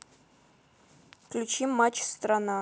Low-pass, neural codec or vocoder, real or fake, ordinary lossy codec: none; none; real; none